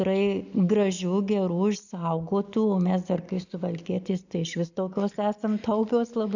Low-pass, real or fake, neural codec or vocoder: 7.2 kHz; real; none